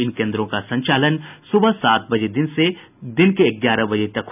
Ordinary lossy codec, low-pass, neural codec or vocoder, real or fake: none; 3.6 kHz; none; real